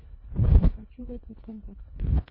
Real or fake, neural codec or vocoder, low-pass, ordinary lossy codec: fake; codec, 24 kHz, 1.5 kbps, HILCodec; 5.4 kHz; MP3, 24 kbps